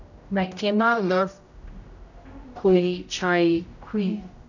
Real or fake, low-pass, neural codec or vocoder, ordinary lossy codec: fake; 7.2 kHz; codec, 16 kHz, 0.5 kbps, X-Codec, HuBERT features, trained on general audio; none